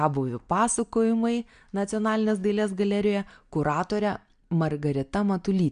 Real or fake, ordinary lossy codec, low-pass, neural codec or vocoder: real; MP3, 64 kbps; 9.9 kHz; none